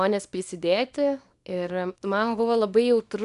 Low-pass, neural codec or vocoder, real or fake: 10.8 kHz; codec, 24 kHz, 0.9 kbps, WavTokenizer, small release; fake